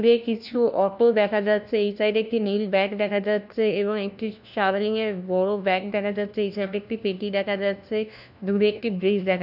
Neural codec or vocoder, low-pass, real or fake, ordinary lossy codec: codec, 16 kHz, 1 kbps, FunCodec, trained on LibriTTS, 50 frames a second; 5.4 kHz; fake; none